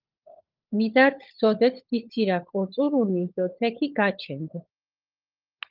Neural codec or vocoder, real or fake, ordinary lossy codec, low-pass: codec, 16 kHz, 16 kbps, FunCodec, trained on LibriTTS, 50 frames a second; fake; Opus, 32 kbps; 5.4 kHz